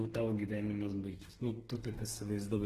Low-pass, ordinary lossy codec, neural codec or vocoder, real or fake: 14.4 kHz; Opus, 16 kbps; codec, 32 kHz, 1.9 kbps, SNAC; fake